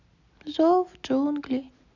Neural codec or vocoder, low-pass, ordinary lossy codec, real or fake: none; 7.2 kHz; none; real